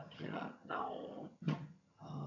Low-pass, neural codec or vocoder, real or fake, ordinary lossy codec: 7.2 kHz; vocoder, 22.05 kHz, 80 mel bands, HiFi-GAN; fake; none